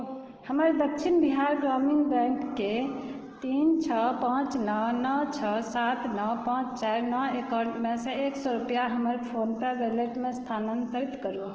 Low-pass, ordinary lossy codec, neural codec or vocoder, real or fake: 7.2 kHz; Opus, 16 kbps; none; real